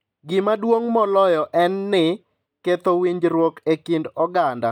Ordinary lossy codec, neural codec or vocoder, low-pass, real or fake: none; none; 19.8 kHz; real